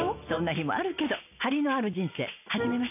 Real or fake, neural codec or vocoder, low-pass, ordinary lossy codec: real; none; 3.6 kHz; none